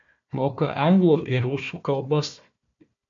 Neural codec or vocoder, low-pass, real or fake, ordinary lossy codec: codec, 16 kHz, 1 kbps, FunCodec, trained on Chinese and English, 50 frames a second; 7.2 kHz; fake; MP3, 64 kbps